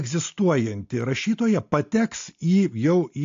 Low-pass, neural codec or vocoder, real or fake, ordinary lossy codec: 7.2 kHz; none; real; AAC, 48 kbps